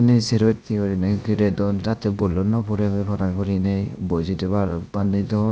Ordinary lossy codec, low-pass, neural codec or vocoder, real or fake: none; none; codec, 16 kHz, 0.7 kbps, FocalCodec; fake